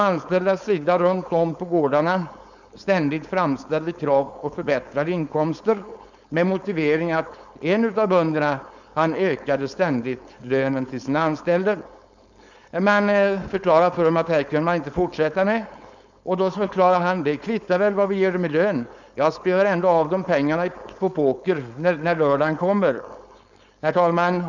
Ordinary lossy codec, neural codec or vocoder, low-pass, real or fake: none; codec, 16 kHz, 4.8 kbps, FACodec; 7.2 kHz; fake